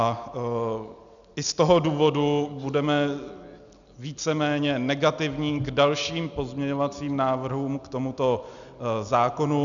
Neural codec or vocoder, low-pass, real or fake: none; 7.2 kHz; real